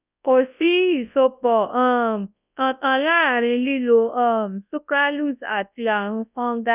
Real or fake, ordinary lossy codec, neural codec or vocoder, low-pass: fake; none; codec, 24 kHz, 0.9 kbps, WavTokenizer, large speech release; 3.6 kHz